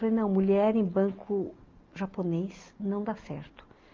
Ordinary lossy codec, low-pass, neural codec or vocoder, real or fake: Opus, 24 kbps; 7.2 kHz; none; real